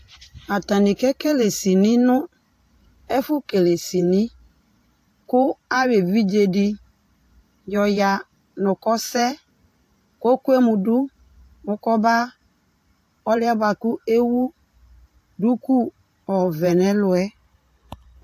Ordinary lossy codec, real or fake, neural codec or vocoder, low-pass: AAC, 64 kbps; fake; vocoder, 44.1 kHz, 128 mel bands every 512 samples, BigVGAN v2; 14.4 kHz